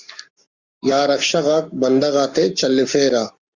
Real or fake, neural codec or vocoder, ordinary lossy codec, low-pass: fake; codec, 44.1 kHz, 7.8 kbps, Pupu-Codec; Opus, 64 kbps; 7.2 kHz